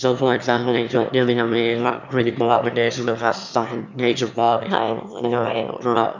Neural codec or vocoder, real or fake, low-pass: autoencoder, 22.05 kHz, a latent of 192 numbers a frame, VITS, trained on one speaker; fake; 7.2 kHz